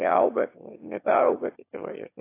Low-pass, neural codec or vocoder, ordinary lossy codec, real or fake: 3.6 kHz; autoencoder, 22.05 kHz, a latent of 192 numbers a frame, VITS, trained on one speaker; AAC, 24 kbps; fake